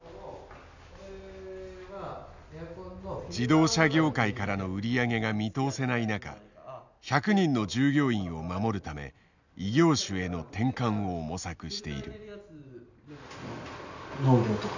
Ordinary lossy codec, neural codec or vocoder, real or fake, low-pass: none; none; real; 7.2 kHz